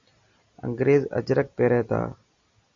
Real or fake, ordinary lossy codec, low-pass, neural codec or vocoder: real; Opus, 64 kbps; 7.2 kHz; none